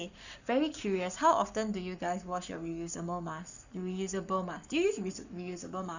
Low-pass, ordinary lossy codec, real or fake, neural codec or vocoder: 7.2 kHz; none; fake; codec, 44.1 kHz, 7.8 kbps, Pupu-Codec